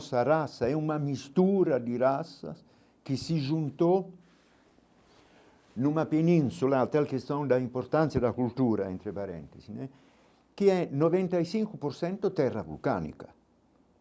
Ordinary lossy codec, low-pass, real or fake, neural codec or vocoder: none; none; real; none